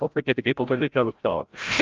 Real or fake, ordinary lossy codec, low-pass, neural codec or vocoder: fake; Opus, 24 kbps; 7.2 kHz; codec, 16 kHz, 0.5 kbps, FreqCodec, larger model